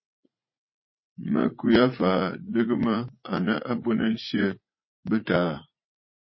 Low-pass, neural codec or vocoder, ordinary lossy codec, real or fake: 7.2 kHz; vocoder, 44.1 kHz, 80 mel bands, Vocos; MP3, 24 kbps; fake